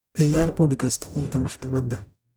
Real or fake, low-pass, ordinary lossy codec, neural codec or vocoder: fake; none; none; codec, 44.1 kHz, 0.9 kbps, DAC